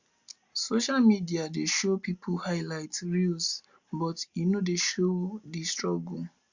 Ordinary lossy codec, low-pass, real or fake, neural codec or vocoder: Opus, 64 kbps; 7.2 kHz; real; none